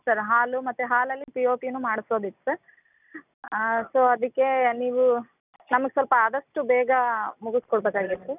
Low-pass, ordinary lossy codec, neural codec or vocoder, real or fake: 3.6 kHz; none; none; real